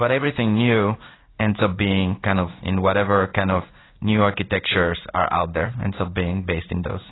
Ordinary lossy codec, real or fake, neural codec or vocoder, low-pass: AAC, 16 kbps; fake; codec, 16 kHz in and 24 kHz out, 1 kbps, XY-Tokenizer; 7.2 kHz